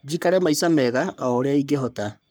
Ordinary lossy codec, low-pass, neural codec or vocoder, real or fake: none; none; codec, 44.1 kHz, 3.4 kbps, Pupu-Codec; fake